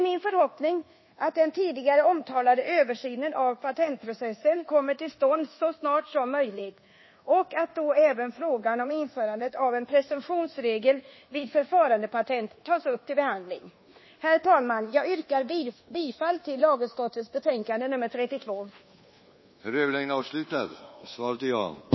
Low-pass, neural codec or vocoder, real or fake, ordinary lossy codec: 7.2 kHz; codec, 24 kHz, 1.2 kbps, DualCodec; fake; MP3, 24 kbps